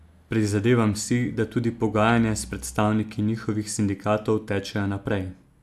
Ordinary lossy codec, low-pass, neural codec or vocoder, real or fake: AAC, 96 kbps; 14.4 kHz; none; real